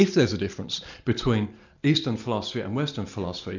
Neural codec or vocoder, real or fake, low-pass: none; real; 7.2 kHz